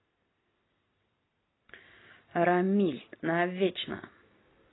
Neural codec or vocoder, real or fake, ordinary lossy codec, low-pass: none; real; AAC, 16 kbps; 7.2 kHz